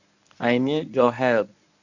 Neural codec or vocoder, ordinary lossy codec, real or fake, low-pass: codec, 24 kHz, 0.9 kbps, WavTokenizer, medium speech release version 1; none; fake; 7.2 kHz